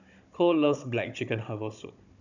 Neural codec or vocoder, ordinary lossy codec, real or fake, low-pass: codec, 16 kHz, 16 kbps, FunCodec, trained on Chinese and English, 50 frames a second; none; fake; 7.2 kHz